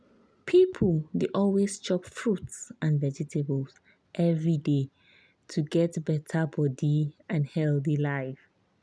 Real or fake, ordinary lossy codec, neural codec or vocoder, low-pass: real; none; none; none